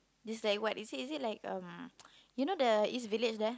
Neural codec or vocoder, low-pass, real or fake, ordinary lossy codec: none; none; real; none